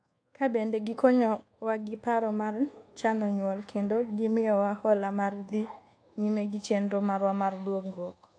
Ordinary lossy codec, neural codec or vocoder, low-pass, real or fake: AAC, 48 kbps; codec, 24 kHz, 1.2 kbps, DualCodec; 9.9 kHz; fake